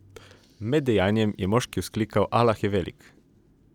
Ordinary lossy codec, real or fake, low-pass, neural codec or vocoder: none; real; 19.8 kHz; none